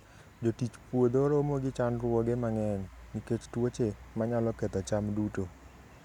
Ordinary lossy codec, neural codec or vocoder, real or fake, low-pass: none; none; real; 19.8 kHz